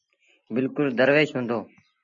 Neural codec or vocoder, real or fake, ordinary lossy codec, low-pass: none; real; MP3, 64 kbps; 7.2 kHz